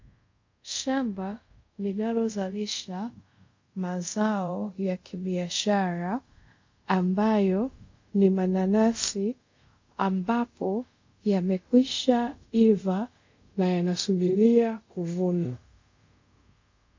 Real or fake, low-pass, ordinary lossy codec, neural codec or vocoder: fake; 7.2 kHz; MP3, 48 kbps; codec, 24 kHz, 0.5 kbps, DualCodec